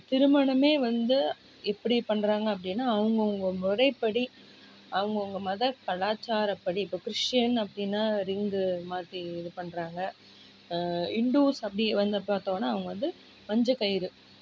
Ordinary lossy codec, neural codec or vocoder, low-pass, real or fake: none; none; none; real